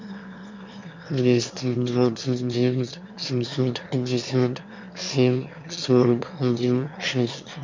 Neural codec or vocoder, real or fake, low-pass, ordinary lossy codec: autoencoder, 22.05 kHz, a latent of 192 numbers a frame, VITS, trained on one speaker; fake; 7.2 kHz; MP3, 64 kbps